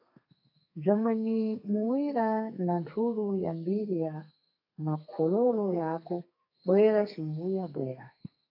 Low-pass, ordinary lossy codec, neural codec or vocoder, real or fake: 5.4 kHz; AAC, 48 kbps; codec, 32 kHz, 1.9 kbps, SNAC; fake